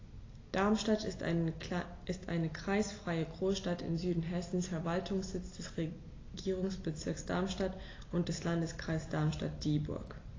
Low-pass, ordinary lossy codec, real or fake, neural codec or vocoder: 7.2 kHz; AAC, 32 kbps; real; none